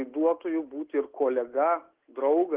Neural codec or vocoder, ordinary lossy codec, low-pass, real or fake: none; Opus, 16 kbps; 3.6 kHz; real